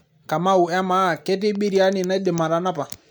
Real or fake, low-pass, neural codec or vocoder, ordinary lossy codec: real; none; none; none